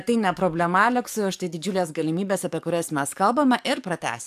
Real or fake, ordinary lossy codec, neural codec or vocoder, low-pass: fake; AAC, 96 kbps; codec, 44.1 kHz, 7.8 kbps, DAC; 14.4 kHz